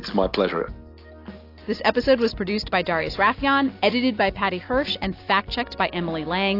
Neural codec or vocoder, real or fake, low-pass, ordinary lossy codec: none; real; 5.4 kHz; AAC, 32 kbps